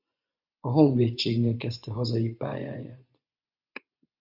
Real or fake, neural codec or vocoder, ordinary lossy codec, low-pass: fake; vocoder, 24 kHz, 100 mel bands, Vocos; Opus, 64 kbps; 5.4 kHz